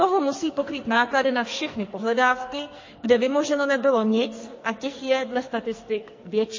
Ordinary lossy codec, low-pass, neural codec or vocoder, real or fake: MP3, 32 kbps; 7.2 kHz; codec, 44.1 kHz, 2.6 kbps, SNAC; fake